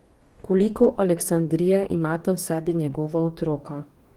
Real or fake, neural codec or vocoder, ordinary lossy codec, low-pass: fake; codec, 44.1 kHz, 2.6 kbps, DAC; Opus, 24 kbps; 19.8 kHz